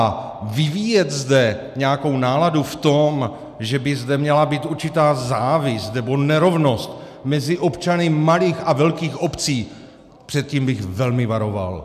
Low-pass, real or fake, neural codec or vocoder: 14.4 kHz; real; none